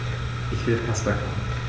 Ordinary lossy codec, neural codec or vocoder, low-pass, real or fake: none; none; none; real